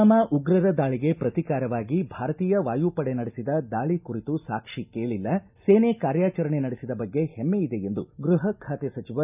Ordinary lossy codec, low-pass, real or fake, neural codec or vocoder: none; 3.6 kHz; real; none